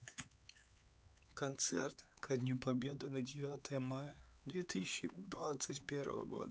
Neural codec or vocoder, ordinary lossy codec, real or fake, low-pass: codec, 16 kHz, 4 kbps, X-Codec, HuBERT features, trained on LibriSpeech; none; fake; none